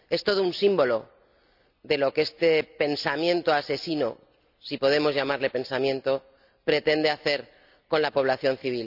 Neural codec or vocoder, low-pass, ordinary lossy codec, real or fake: none; 5.4 kHz; none; real